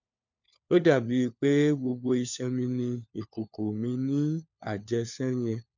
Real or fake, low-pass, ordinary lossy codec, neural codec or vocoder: fake; 7.2 kHz; none; codec, 16 kHz, 4 kbps, FunCodec, trained on LibriTTS, 50 frames a second